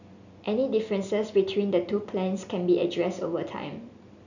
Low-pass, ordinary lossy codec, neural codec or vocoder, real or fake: 7.2 kHz; none; none; real